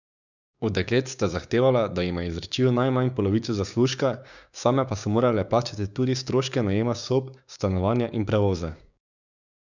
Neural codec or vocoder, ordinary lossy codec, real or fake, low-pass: codec, 16 kHz, 6 kbps, DAC; none; fake; 7.2 kHz